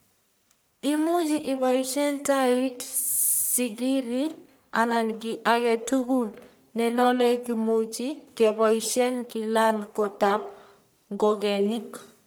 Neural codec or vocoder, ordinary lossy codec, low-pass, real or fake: codec, 44.1 kHz, 1.7 kbps, Pupu-Codec; none; none; fake